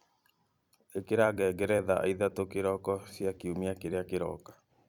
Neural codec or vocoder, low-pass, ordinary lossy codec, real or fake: vocoder, 44.1 kHz, 128 mel bands every 256 samples, BigVGAN v2; 19.8 kHz; none; fake